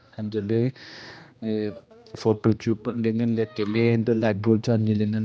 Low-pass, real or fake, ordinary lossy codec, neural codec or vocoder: none; fake; none; codec, 16 kHz, 1 kbps, X-Codec, HuBERT features, trained on general audio